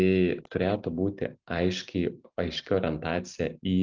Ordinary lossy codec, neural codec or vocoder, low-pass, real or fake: Opus, 24 kbps; none; 7.2 kHz; real